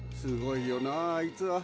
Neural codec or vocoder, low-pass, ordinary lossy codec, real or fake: none; none; none; real